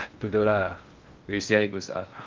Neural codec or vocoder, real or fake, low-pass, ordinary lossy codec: codec, 16 kHz in and 24 kHz out, 0.6 kbps, FocalCodec, streaming, 2048 codes; fake; 7.2 kHz; Opus, 32 kbps